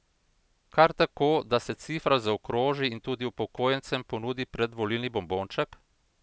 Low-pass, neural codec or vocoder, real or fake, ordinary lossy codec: none; none; real; none